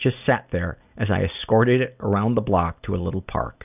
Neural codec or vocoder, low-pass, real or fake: none; 3.6 kHz; real